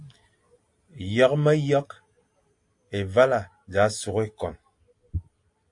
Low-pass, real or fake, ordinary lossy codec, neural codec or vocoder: 10.8 kHz; real; AAC, 64 kbps; none